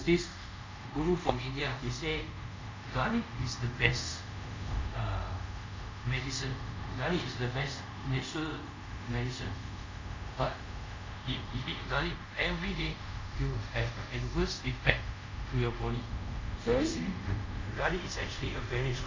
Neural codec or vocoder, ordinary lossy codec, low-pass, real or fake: codec, 24 kHz, 0.5 kbps, DualCodec; MP3, 64 kbps; 7.2 kHz; fake